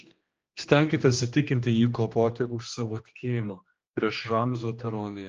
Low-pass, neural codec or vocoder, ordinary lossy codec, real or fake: 7.2 kHz; codec, 16 kHz, 1 kbps, X-Codec, HuBERT features, trained on general audio; Opus, 24 kbps; fake